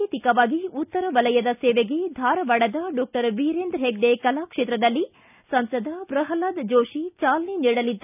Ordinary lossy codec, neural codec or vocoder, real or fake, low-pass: none; none; real; 3.6 kHz